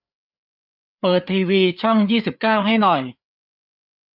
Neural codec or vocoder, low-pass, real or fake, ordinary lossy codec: codec, 16 kHz, 4 kbps, FreqCodec, larger model; 5.4 kHz; fake; none